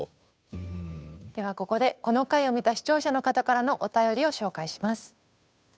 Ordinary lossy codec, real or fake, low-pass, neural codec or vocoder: none; fake; none; codec, 16 kHz, 2 kbps, FunCodec, trained on Chinese and English, 25 frames a second